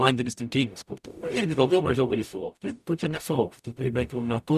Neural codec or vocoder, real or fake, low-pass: codec, 44.1 kHz, 0.9 kbps, DAC; fake; 14.4 kHz